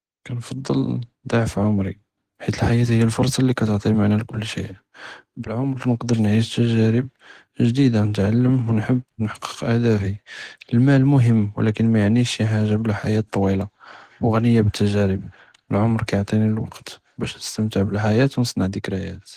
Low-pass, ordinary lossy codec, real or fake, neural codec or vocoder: 14.4 kHz; Opus, 16 kbps; real; none